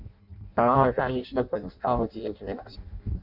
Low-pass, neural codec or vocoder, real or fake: 5.4 kHz; codec, 16 kHz in and 24 kHz out, 0.6 kbps, FireRedTTS-2 codec; fake